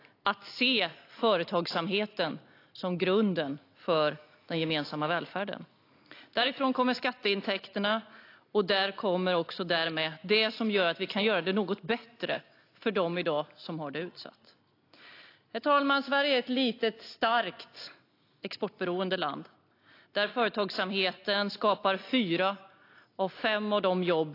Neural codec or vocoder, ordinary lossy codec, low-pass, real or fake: none; AAC, 32 kbps; 5.4 kHz; real